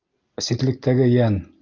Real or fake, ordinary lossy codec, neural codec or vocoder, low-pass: real; Opus, 24 kbps; none; 7.2 kHz